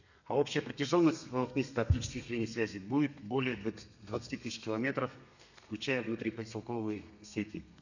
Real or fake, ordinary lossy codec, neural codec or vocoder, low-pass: fake; none; codec, 32 kHz, 1.9 kbps, SNAC; 7.2 kHz